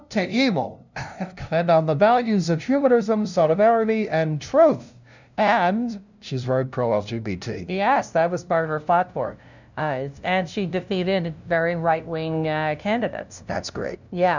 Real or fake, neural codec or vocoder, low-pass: fake; codec, 16 kHz, 0.5 kbps, FunCodec, trained on LibriTTS, 25 frames a second; 7.2 kHz